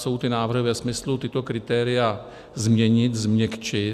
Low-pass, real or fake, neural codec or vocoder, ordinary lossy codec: 14.4 kHz; real; none; Opus, 64 kbps